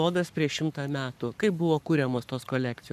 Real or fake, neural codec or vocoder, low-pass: fake; codec, 44.1 kHz, 7.8 kbps, Pupu-Codec; 14.4 kHz